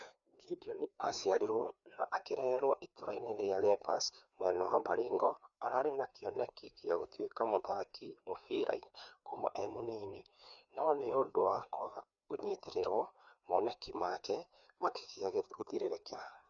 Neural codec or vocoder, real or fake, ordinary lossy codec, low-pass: codec, 16 kHz, 2 kbps, FreqCodec, larger model; fake; none; 7.2 kHz